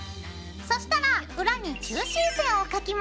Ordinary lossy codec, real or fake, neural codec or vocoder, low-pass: none; real; none; none